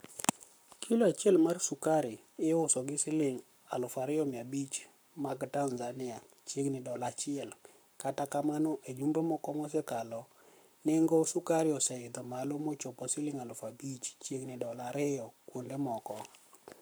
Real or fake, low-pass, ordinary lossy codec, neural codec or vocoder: fake; none; none; codec, 44.1 kHz, 7.8 kbps, Pupu-Codec